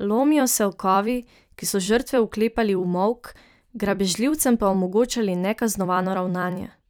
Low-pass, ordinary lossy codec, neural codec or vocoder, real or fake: none; none; vocoder, 44.1 kHz, 128 mel bands every 256 samples, BigVGAN v2; fake